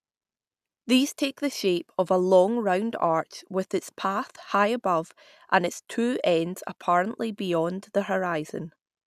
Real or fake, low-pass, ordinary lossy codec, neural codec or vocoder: real; 14.4 kHz; none; none